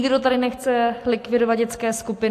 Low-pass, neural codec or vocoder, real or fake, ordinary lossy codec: 14.4 kHz; none; real; AAC, 64 kbps